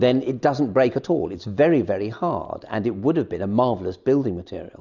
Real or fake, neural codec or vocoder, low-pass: real; none; 7.2 kHz